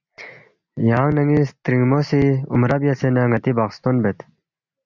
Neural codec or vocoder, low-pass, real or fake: none; 7.2 kHz; real